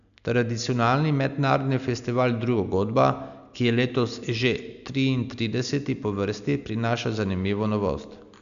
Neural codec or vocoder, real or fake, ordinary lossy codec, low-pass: none; real; none; 7.2 kHz